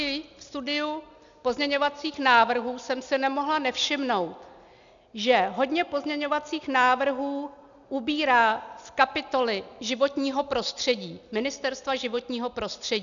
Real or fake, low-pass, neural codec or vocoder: real; 7.2 kHz; none